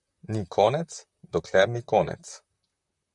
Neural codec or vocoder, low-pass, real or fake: vocoder, 44.1 kHz, 128 mel bands, Pupu-Vocoder; 10.8 kHz; fake